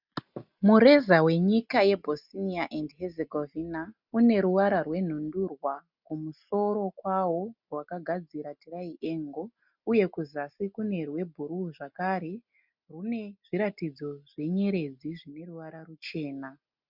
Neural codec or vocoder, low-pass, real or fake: none; 5.4 kHz; real